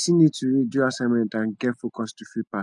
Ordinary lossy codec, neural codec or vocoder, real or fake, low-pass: none; none; real; 10.8 kHz